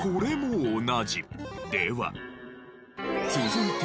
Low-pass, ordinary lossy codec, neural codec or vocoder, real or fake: none; none; none; real